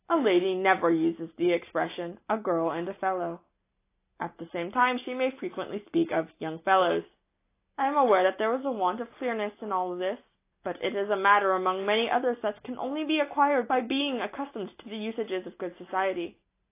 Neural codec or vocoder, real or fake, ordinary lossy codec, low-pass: none; real; AAC, 24 kbps; 3.6 kHz